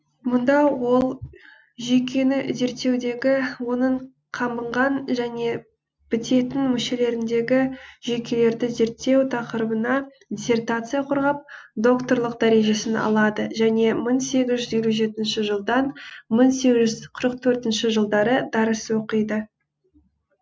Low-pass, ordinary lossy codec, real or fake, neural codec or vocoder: none; none; real; none